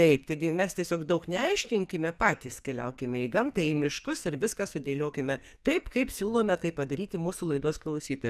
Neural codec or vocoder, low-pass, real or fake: codec, 44.1 kHz, 2.6 kbps, SNAC; 14.4 kHz; fake